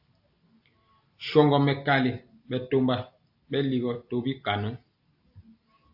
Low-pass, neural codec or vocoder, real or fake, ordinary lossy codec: 5.4 kHz; none; real; AAC, 48 kbps